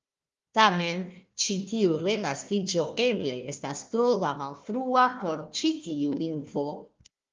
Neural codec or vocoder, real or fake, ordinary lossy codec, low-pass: codec, 16 kHz, 1 kbps, FunCodec, trained on Chinese and English, 50 frames a second; fake; Opus, 32 kbps; 7.2 kHz